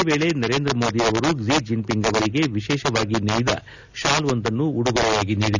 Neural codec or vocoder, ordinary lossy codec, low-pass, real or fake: none; none; 7.2 kHz; real